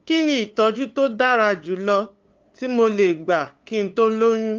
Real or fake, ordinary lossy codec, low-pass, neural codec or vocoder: fake; Opus, 32 kbps; 7.2 kHz; codec, 16 kHz, 2 kbps, FunCodec, trained on LibriTTS, 25 frames a second